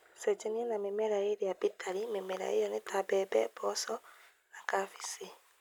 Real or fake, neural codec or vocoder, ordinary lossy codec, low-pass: real; none; none; none